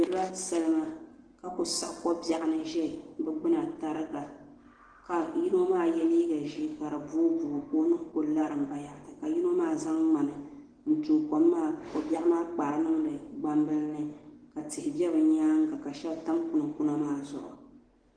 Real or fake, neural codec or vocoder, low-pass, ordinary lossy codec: real; none; 9.9 kHz; Opus, 16 kbps